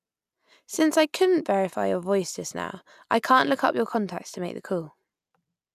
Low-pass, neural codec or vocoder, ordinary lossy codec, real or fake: 14.4 kHz; none; none; real